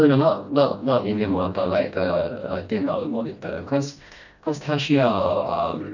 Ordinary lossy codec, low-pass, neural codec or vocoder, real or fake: none; 7.2 kHz; codec, 16 kHz, 1 kbps, FreqCodec, smaller model; fake